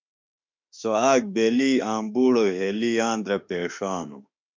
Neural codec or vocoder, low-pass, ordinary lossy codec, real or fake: autoencoder, 48 kHz, 32 numbers a frame, DAC-VAE, trained on Japanese speech; 7.2 kHz; MP3, 64 kbps; fake